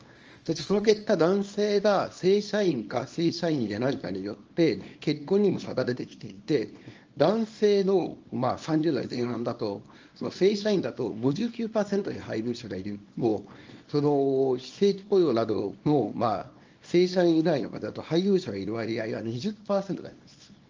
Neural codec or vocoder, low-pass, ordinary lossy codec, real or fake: codec, 24 kHz, 0.9 kbps, WavTokenizer, small release; 7.2 kHz; Opus, 24 kbps; fake